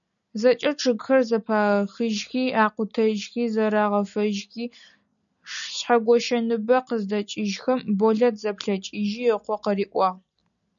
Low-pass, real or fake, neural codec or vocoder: 7.2 kHz; real; none